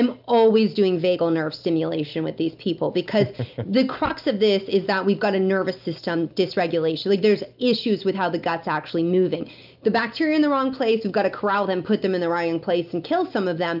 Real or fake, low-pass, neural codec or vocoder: real; 5.4 kHz; none